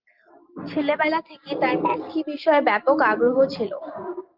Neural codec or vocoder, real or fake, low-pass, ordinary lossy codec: none; real; 5.4 kHz; Opus, 32 kbps